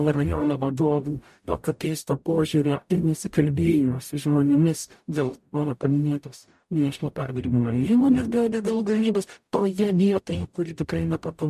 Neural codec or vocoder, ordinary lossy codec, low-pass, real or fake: codec, 44.1 kHz, 0.9 kbps, DAC; MP3, 64 kbps; 14.4 kHz; fake